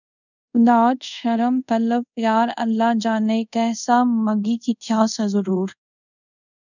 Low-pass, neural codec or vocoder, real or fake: 7.2 kHz; codec, 24 kHz, 0.5 kbps, DualCodec; fake